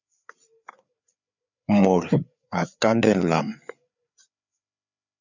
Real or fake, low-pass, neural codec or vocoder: fake; 7.2 kHz; codec, 16 kHz, 4 kbps, FreqCodec, larger model